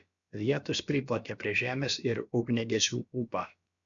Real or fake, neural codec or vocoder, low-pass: fake; codec, 16 kHz, about 1 kbps, DyCAST, with the encoder's durations; 7.2 kHz